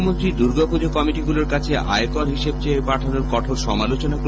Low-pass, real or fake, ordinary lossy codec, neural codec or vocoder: none; real; none; none